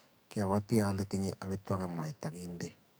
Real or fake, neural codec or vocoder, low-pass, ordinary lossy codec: fake; codec, 44.1 kHz, 2.6 kbps, SNAC; none; none